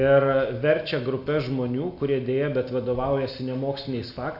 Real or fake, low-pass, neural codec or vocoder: real; 5.4 kHz; none